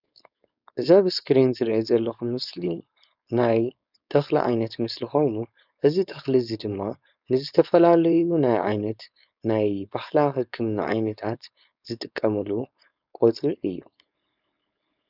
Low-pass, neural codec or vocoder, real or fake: 5.4 kHz; codec, 16 kHz, 4.8 kbps, FACodec; fake